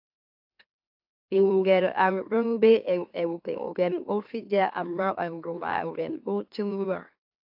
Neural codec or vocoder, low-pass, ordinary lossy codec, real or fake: autoencoder, 44.1 kHz, a latent of 192 numbers a frame, MeloTTS; 5.4 kHz; AAC, 48 kbps; fake